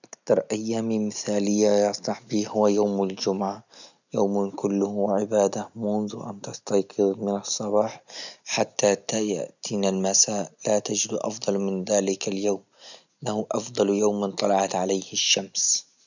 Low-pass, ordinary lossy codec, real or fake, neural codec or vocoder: 7.2 kHz; none; real; none